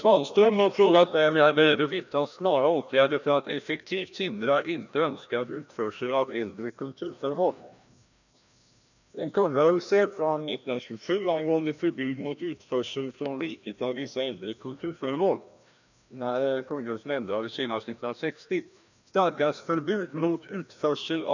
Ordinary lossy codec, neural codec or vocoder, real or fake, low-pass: none; codec, 16 kHz, 1 kbps, FreqCodec, larger model; fake; 7.2 kHz